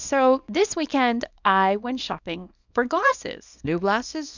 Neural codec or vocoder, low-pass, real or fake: codec, 24 kHz, 0.9 kbps, WavTokenizer, small release; 7.2 kHz; fake